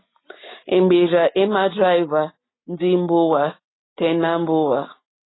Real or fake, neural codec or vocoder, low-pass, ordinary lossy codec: real; none; 7.2 kHz; AAC, 16 kbps